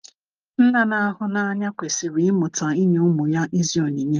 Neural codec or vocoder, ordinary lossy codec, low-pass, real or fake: none; Opus, 24 kbps; 7.2 kHz; real